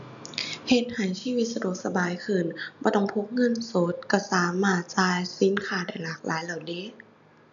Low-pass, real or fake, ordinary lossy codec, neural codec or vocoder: 7.2 kHz; real; none; none